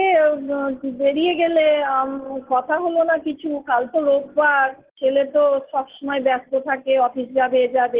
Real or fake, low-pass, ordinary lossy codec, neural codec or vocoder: real; 3.6 kHz; Opus, 16 kbps; none